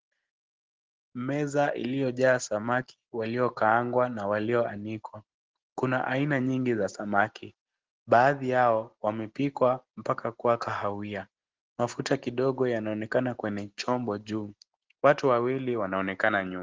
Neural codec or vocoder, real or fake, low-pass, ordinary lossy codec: none; real; 7.2 kHz; Opus, 16 kbps